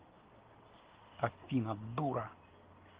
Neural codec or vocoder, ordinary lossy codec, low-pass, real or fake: none; Opus, 16 kbps; 3.6 kHz; real